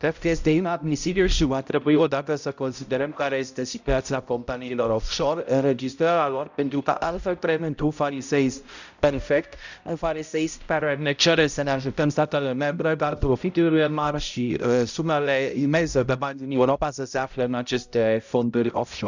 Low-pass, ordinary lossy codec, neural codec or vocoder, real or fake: 7.2 kHz; none; codec, 16 kHz, 0.5 kbps, X-Codec, HuBERT features, trained on balanced general audio; fake